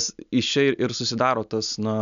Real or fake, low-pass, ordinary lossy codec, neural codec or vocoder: real; 7.2 kHz; MP3, 96 kbps; none